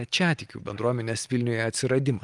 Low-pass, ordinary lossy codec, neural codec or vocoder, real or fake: 10.8 kHz; Opus, 32 kbps; none; real